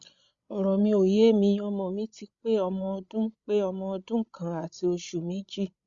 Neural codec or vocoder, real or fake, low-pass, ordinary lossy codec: codec, 16 kHz, 16 kbps, FreqCodec, larger model; fake; 7.2 kHz; Opus, 64 kbps